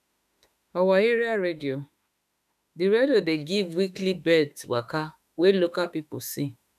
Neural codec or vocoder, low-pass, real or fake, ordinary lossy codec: autoencoder, 48 kHz, 32 numbers a frame, DAC-VAE, trained on Japanese speech; 14.4 kHz; fake; none